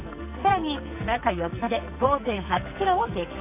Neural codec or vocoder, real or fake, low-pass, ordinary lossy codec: codec, 44.1 kHz, 2.6 kbps, SNAC; fake; 3.6 kHz; none